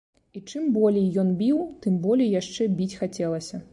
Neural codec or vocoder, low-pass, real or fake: none; 10.8 kHz; real